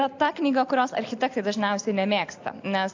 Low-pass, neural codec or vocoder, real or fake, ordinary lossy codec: 7.2 kHz; none; real; AAC, 48 kbps